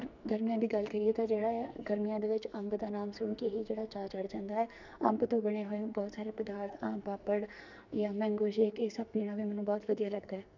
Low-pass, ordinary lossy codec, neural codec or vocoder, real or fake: 7.2 kHz; none; codec, 44.1 kHz, 2.6 kbps, SNAC; fake